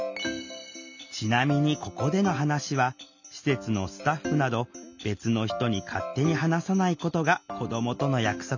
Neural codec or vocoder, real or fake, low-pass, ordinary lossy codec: none; real; 7.2 kHz; none